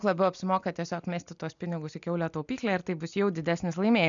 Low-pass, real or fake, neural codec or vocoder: 7.2 kHz; real; none